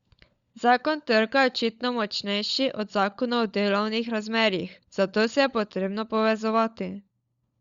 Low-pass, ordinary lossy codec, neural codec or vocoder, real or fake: 7.2 kHz; Opus, 64 kbps; codec, 16 kHz, 16 kbps, FunCodec, trained on LibriTTS, 50 frames a second; fake